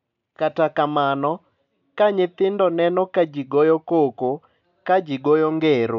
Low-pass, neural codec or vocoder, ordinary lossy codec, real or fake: 7.2 kHz; none; none; real